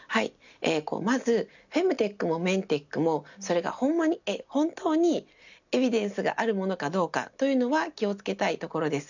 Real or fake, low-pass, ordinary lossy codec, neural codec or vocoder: real; 7.2 kHz; none; none